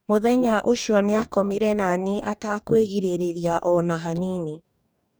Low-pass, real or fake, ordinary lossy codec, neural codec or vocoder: none; fake; none; codec, 44.1 kHz, 2.6 kbps, DAC